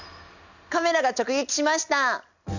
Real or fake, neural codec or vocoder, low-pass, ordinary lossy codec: real; none; 7.2 kHz; none